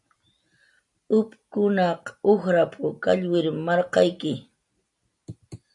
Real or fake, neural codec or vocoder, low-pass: real; none; 10.8 kHz